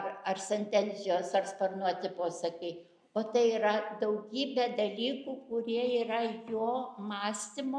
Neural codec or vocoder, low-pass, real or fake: none; 9.9 kHz; real